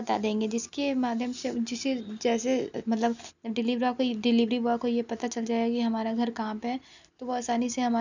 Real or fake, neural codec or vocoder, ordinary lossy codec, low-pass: real; none; none; 7.2 kHz